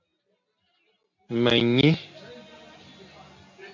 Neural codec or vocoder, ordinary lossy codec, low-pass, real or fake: none; MP3, 48 kbps; 7.2 kHz; real